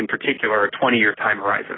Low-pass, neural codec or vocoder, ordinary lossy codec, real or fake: 7.2 kHz; none; AAC, 16 kbps; real